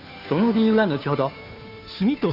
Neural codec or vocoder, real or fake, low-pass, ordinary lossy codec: codec, 16 kHz, 2 kbps, FunCodec, trained on Chinese and English, 25 frames a second; fake; 5.4 kHz; none